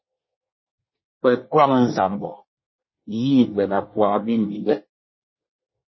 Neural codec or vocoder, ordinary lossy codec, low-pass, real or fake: codec, 24 kHz, 1 kbps, SNAC; MP3, 24 kbps; 7.2 kHz; fake